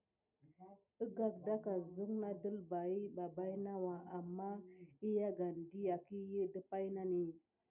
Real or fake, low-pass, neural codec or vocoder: real; 3.6 kHz; none